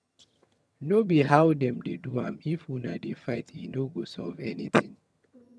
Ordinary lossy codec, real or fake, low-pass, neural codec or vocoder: none; fake; none; vocoder, 22.05 kHz, 80 mel bands, HiFi-GAN